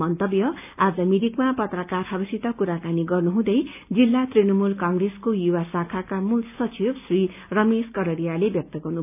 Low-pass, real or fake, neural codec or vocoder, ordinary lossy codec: 3.6 kHz; real; none; none